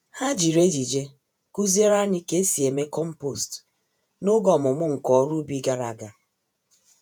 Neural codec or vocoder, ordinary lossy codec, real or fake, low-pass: vocoder, 48 kHz, 128 mel bands, Vocos; none; fake; none